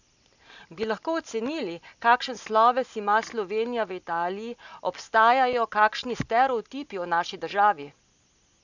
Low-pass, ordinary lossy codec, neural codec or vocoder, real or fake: 7.2 kHz; none; none; real